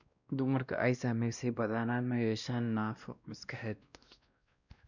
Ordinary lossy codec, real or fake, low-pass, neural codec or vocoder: none; fake; 7.2 kHz; codec, 16 kHz, 1 kbps, X-Codec, WavLM features, trained on Multilingual LibriSpeech